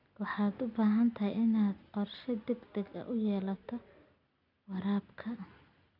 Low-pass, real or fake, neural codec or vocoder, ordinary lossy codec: 5.4 kHz; real; none; none